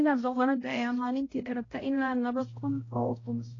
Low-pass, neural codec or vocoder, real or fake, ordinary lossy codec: 7.2 kHz; codec, 16 kHz, 0.5 kbps, X-Codec, HuBERT features, trained on balanced general audio; fake; AAC, 32 kbps